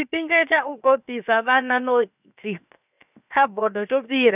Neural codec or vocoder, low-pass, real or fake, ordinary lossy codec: codec, 16 kHz, 0.7 kbps, FocalCodec; 3.6 kHz; fake; none